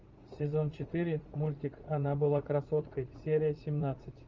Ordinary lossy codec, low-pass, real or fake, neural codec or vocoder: Opus, 32 kbps; 7.2 kHz; fake; vocoder, 44.1 kHz, 80 mel bands, Vocos